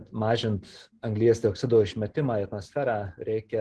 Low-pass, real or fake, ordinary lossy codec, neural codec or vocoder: 10.8 kHz; real; Opus, 16 kbps; none